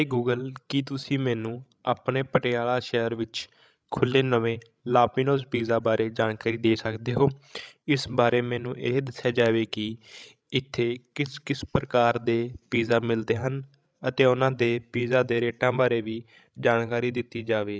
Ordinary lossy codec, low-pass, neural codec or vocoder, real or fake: none; none; codec, 16 kHz, 16 kbps, FreqCodec, larger model; fake